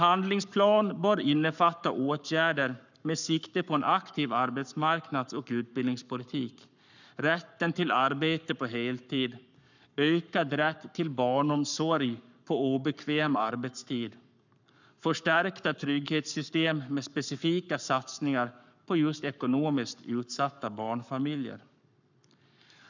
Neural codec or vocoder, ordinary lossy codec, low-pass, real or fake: codec, 44.1 kHz, 7.8 kbps, Pupu-Codec; none; 7.2 kHz; fake